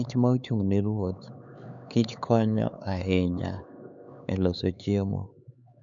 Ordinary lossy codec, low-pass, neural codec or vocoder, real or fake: none; 7.2 kHz; codec, 16 kHz, 4 kbps, X-Codec, HuBERT features, trained on LibriSpeech; fake